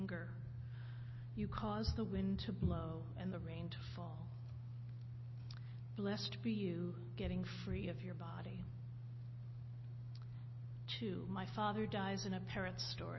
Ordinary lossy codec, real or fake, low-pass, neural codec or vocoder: MP3, 24 kbps; real; 7.2 kHz; none